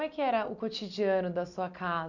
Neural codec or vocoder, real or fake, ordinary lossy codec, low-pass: none; real; none; 7.2 kHz